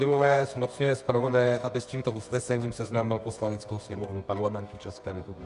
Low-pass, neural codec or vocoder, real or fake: 10.8 kHz; codec, 24 kHz, 0.9 kbps, WavTokenizer, medium music audio release; fake